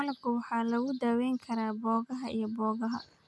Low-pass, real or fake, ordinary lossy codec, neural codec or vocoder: none; real; none; none